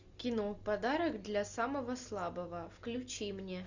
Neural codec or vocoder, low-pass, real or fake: none; 7.2 kHz; real